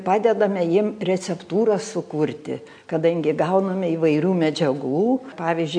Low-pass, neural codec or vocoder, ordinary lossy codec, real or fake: 9.9 kHz; none; AAC, 64 kbps; real